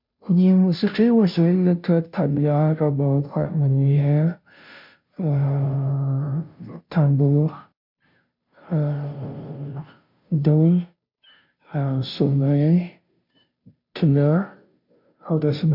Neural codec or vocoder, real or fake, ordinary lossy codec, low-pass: codec, 16 kHz, 0.5 kbps, FunCodec, trained on Chinese and English, 25 frames a second; fake; MP3, 48 kbps; 5.4 kHz